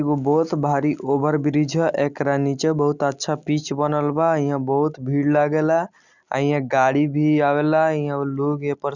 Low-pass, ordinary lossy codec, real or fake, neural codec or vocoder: 7.2 kHz; Opus, 64 kbps; real; none